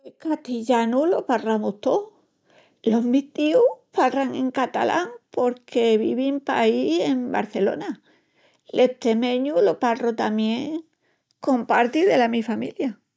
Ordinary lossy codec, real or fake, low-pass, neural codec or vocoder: none; real; none; none